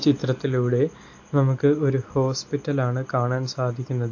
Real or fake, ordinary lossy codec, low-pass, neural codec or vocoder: real; AAC, 48 kbps; 7.2 kHz; none